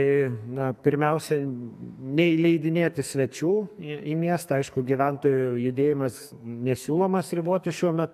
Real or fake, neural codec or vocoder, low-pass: fake; codec, 44.1 kHz, 2.6 kbps, SNAC; 14.4 kHz